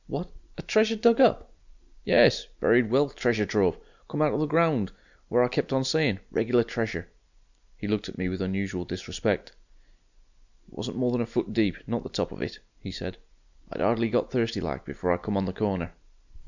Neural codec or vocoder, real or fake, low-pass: none; real; 7.2 kHz